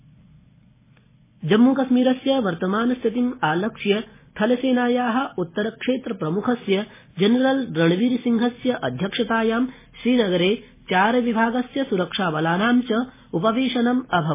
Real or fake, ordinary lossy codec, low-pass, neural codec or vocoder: real; MP3, 16 kbps; 3.6 kHz; none